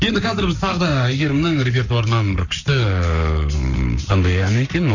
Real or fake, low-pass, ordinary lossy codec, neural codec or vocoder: fake; 7.2 kHz; none; codec, 44.1 kHz, 7.8 kbps, Pupu-Codec